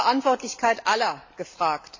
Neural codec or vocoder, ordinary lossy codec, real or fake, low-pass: none; AAC, 48 kbps; real; 7.2 kHz